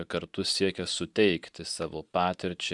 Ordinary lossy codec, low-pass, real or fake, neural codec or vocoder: Opus, 64 kbps; 10.8 kHz; fake; vocoder, 44.1 kHz, 128 mel bands every 512 samples, BigVGAN v2